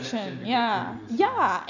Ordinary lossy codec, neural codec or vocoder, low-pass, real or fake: none; none; 7.2 kHz; real